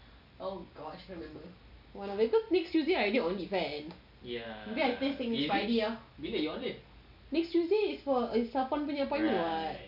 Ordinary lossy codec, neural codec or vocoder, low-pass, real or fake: none; none; 5.4 kHz; real